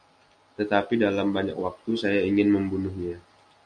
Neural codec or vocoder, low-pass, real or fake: none; 9.9 kHz; real